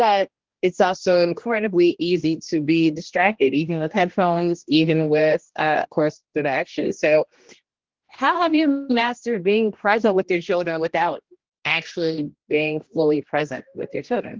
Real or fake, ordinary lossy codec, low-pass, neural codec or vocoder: fake; Opus, 16 kbps; 7.2 kHz; codec, 16 kHz, 1 kbps, X-Codec, HuBERT features, trained on general audio